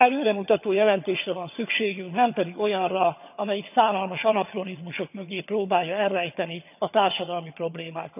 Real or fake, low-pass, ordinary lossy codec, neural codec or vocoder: fake; 3.6 kHz; none; vocoder, 22.05 kHz, 80 mel bands, HiFi-GAN